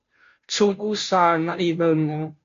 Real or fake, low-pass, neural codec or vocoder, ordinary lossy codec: fake; 7.2 kHz; codec, 16 kHz, 0.5 kbps, FunCodec, trained on Chinese and English, 25 frames a second; MP3, 48 kbps